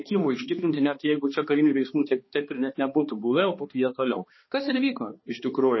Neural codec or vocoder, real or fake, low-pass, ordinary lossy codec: codec, 16 kHz, 2 kbps, X-Codec, HuBERT features, trained on balanced general audio; fake; 7.2 kHz; MP3, 24 kbps